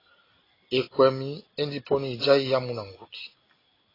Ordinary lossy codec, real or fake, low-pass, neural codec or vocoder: AAC, 24 kbps; real; 5.4 kHz; none